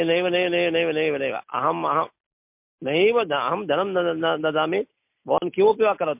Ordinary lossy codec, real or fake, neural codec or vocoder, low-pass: MP3, 32 kbps; real; none; 3.6 kHz